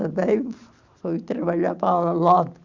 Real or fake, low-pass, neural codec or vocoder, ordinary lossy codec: real; 7.2 kHz; none; Opus, 64 kbps